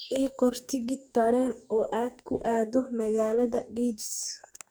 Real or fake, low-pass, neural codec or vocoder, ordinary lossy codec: fake; none; codec, 44.1 kHz, 2.6 kbps, DAC; none